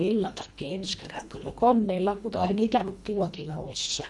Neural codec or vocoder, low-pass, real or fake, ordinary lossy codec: codec, 24 kHz, 1.5 kbps, HILCodec; none; fake; none